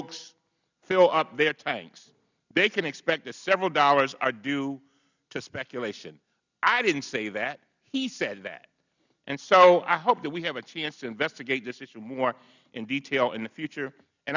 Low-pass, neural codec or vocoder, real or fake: 7.2 kHz; none; real